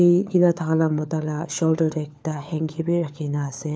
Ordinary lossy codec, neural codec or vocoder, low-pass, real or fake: none; codec, 16 kHz, 4 kbps, FunCodec, trained on LibriTTS, 50 frames a second; none; fake